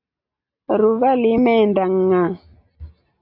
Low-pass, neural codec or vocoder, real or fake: 5.4 kHz; none; real